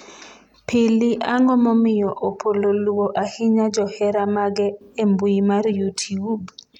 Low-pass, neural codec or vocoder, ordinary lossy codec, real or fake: 19.8 kHz; none; none; real